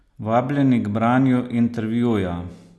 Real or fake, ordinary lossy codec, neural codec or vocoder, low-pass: real; none; none; none